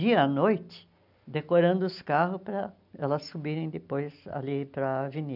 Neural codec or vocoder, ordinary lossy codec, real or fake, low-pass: none; none; real; 5.4 kHz